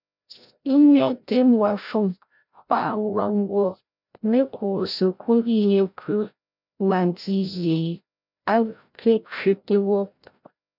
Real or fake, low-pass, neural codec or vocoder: fake; 5.4 kHz; codec, 16 kHz, 0.5 kbps, FreqCodec, larger model